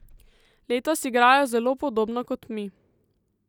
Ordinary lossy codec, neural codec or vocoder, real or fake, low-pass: none; none; real; 19.8 kHz